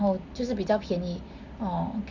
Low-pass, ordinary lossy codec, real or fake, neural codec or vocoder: 7.2 kHz; none; real; none